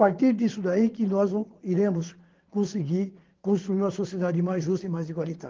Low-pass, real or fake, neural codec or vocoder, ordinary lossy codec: 7.2 kHz; fake; vocoder, 22.05 kHz, 80 mel bands, Vocos; Opus, 16 kbps